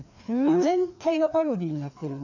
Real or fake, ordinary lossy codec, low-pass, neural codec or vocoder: fake; none; 7.2 kHz; codec, 16 kHz, 2 kbps, FreqCodec, larger model